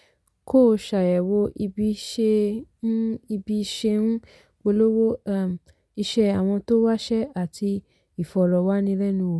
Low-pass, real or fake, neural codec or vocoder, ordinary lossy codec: none; real; none; none